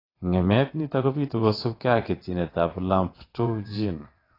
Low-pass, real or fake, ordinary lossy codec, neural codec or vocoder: 5.4 kHz; fake; AAC, 24 kbps; vocoder, 22.05 kHz, 80 mel bands, WaveNeXt